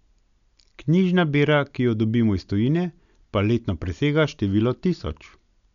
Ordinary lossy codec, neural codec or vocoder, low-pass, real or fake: none; none; 7.2 kHz; real